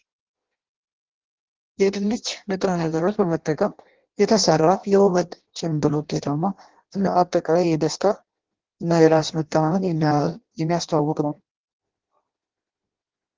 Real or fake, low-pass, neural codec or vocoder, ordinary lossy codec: fake; 7.2 kHz; codec, 16 kHz in and 24 kHz out, 0.6 kbps, FireRedTTS-2 codec; Opus, 16 kbps